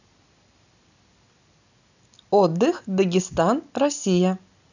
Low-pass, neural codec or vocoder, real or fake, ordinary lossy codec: 7.2 kHz; none; real; none